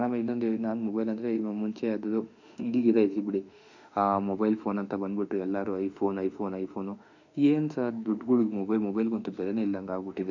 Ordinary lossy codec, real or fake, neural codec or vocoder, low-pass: none; fake; autoencoder, 48 kHz, 32 numbers a frame, DAC-VAE, trained on Japanese speech; 7.2 kHz